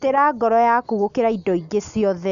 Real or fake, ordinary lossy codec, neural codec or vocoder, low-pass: real; none; none; 7.2 kHz